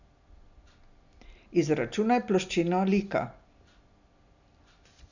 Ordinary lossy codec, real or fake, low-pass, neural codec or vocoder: none; real; 7.2 kHz; none